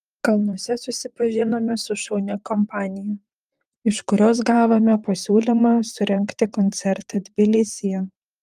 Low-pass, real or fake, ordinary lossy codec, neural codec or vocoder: 14.4 kHz; fake; Opus, 32 kbps; vocoder, 44.1 kHz, 128 mel bands, Pupu-Vocoder